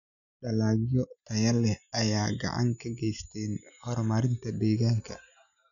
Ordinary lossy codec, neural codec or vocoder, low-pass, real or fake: MP3, 96 kbps; none; 7.2 kHz; real